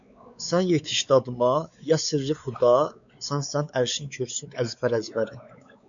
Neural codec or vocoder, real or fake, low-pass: codec, 16 kHz, 4 kbps, X-Codec, WavLM features, trained on Multilingual LibriSpeech; fake; 7.2 kHz